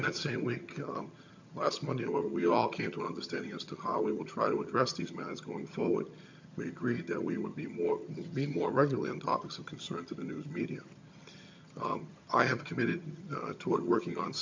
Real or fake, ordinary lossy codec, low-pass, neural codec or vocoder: fake; MP3, 64 kbps; 7.2 kHz; vocoder, 22.05 kHz, 80 mel bands, HiFi-GAN